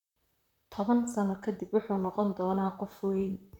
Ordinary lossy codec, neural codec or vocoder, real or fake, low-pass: none; vocoder, 44.1 kHz, 128 mel bands, Pupu-Vocoder; fake; 19.8 kHz